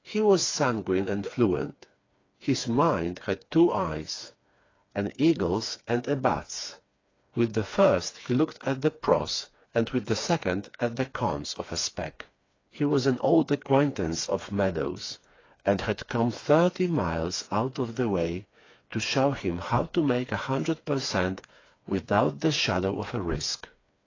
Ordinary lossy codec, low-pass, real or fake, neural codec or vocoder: AAC, 32 kbps; 7.2 kHz; fake; codec, 16 kHz, 4 kbps, FreqCodec, smaller model